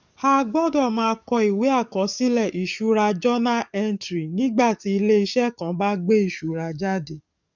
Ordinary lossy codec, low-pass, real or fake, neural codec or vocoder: none; 7.2 kHz; fake; codec, 44.1 kHz, 7.8 kbps, DAC